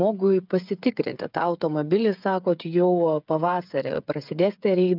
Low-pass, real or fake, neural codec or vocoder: 5.4 kHz; fake; codec, 16 kHz, 8 kbps, FreqCodec, smaller model